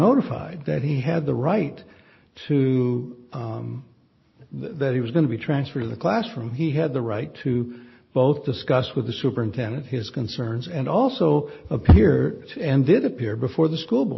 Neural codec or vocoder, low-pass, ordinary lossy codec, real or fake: none; 7.2 kHz; MP3, 24 kbps; real